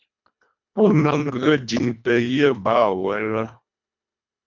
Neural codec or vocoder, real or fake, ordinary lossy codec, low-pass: codec, 24 kHz, 1.5 kbps, HILCodec; fake; MP3, 64 kbps; 7.2 kHz